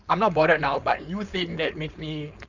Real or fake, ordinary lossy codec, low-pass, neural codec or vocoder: fake; none; 7.2 kHz; codec, 16 kHz, 4.8 kbps, FACodec